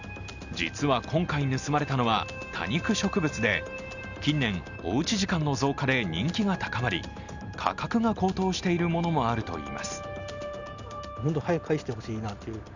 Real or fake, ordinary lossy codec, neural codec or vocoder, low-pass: real; none; none; 7.2 kHz